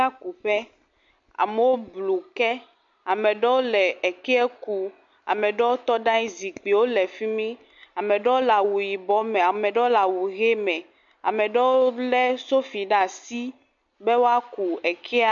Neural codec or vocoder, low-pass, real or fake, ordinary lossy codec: none; 7.2 kHz; real; MP3, 48 kbps